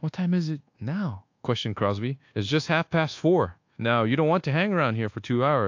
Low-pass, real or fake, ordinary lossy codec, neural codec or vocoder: 7.2 kHz; fake; AAC, 48 kbps; codec, 24 kHz, 1.2 kbps, DualCodec